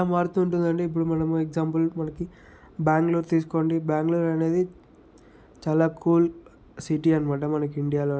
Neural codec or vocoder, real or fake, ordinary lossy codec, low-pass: none; real; none; none